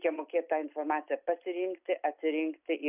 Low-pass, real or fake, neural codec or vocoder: 3.6 kHz; real; none